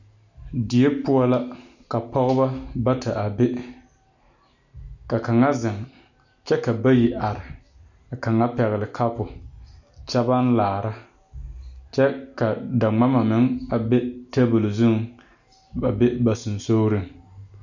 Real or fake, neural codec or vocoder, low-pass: real; none; 7.2 kHz